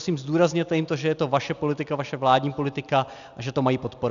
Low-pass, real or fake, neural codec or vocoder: 7.2 kHz; real; none